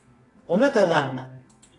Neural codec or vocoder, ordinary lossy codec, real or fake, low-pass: codec, 24 kHz, 0.9 kbps, WavTokenizer, medium music audio release; AAC, 32 kbps; fake; 10.8 kHz